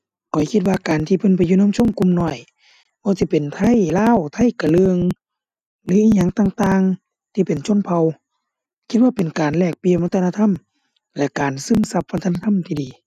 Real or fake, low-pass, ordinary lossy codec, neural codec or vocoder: real; 14.4 kHz; none; none